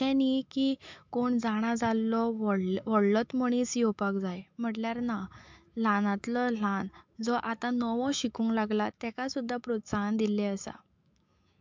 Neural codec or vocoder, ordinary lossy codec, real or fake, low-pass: autoencoder, 48 kHz, 128 numbers a frame, DAC-VAE, trained on Japanese speech; MP3, 64 kbps; fake; 7.2 kHz